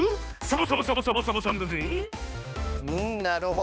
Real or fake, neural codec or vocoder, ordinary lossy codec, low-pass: fake; codec, 16 kHz, 2 kbps, X-Codec, HuBERT features, trained on balanced general audio; none; none